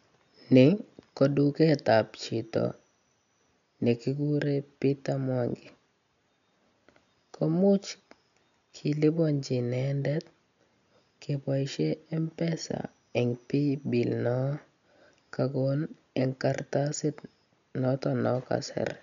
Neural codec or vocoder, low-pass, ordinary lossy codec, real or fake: none; 7.2 kHz; MP3, 96 kbps; real